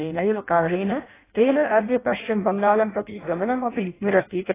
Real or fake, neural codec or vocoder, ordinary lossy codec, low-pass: fake; codec, 16 kHz in and 24 kHz out, 0.6 kbps, FireRedTTS-2 codec; AAC, 16 kbps; 3.6 kHz